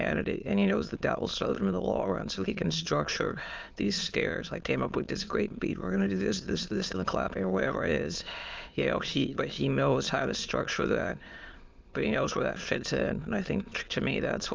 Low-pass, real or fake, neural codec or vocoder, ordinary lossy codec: 7.2 kHz; fake; autoencoder, 22.05 kHz, a latent of 192 numbers a frame, VITS, trained on many speakers; Opus, 24 kbps